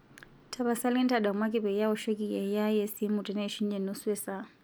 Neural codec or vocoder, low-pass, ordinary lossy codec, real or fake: none; none; none; real